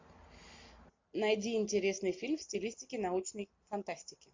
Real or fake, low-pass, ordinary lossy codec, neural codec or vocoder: real; 7.2 kHz; MP3, 48 kbps; none